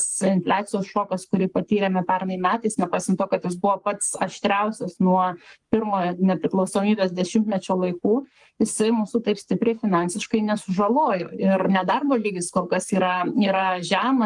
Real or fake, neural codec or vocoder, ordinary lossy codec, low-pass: fake; codec, 44.1 kHz, 7.8 kbps, Pupu-Codec; Opus, 32 kbps; 10.8 kHz